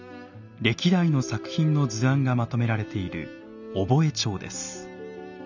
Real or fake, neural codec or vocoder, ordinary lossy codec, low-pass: real; none; none; 7.2 kHz